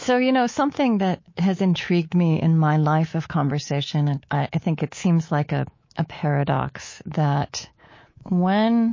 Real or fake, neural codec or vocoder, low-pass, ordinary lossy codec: fake; codec, 24 kHz, 3.1 kbps, DualCodec; 7.2 kHz; MP3, 32 kbps